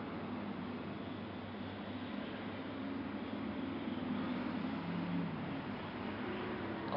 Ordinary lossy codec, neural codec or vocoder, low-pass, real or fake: none; none; 5.4 kHz; real